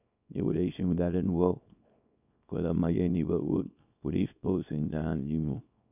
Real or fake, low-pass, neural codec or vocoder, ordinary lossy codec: fake; 3.6 kHz; codec, 24 kHz, 0.9 kbps, WavTokenizer, small release; none